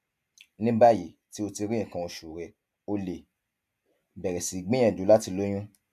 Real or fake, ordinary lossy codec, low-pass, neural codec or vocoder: real; MP3, 96 kbps; 14.4 kHz; none